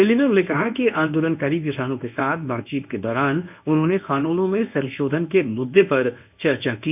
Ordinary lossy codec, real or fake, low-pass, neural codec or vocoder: none; fake; 3.6 kHz; codec, 24 kHz, 0.9 kbps, WavTokenizer, medium speech release version 1